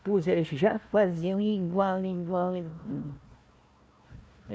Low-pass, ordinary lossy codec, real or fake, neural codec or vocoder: none; none; fake; codec, 16 kHz, 1 kbps, FunCodec, trained on Chinese and English, 50 frames a second